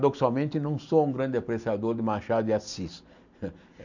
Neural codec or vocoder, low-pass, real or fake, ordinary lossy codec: none; 7.2 kHz; real; none